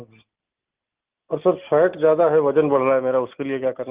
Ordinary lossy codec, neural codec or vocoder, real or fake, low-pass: Opus, 32 kbps; none; real; 3.6 kHz